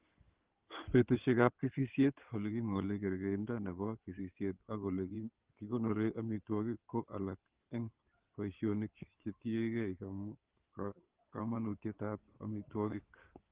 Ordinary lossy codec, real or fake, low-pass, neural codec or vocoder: Opus, 16 kbps; fake; 3.6 kHz; codec, 16 kHz in and 24 kHz out, 2.2 kbps, FireRedTTS-2 codec